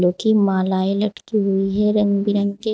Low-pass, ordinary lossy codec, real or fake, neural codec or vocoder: none; none; real; none